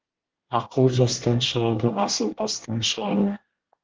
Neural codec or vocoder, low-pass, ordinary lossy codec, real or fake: codec, 24 kHz, 1 kbps, SNAC; 7.2 kHz; Opus, 16 kbps; fake